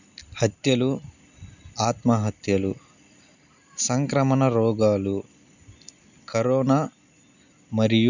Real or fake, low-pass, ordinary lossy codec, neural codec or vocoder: real; 7.2 kHz; none; none